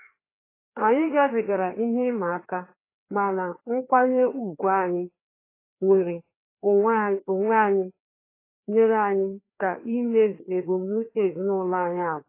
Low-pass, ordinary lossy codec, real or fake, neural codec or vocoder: 3.6 kHz; AAC, 24 kbps; fake; codec, 16 kHz, 2 kbps, FreqCodec, larger model